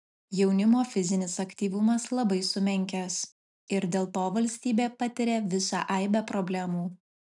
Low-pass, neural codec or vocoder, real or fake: 10.8 kHz; none; real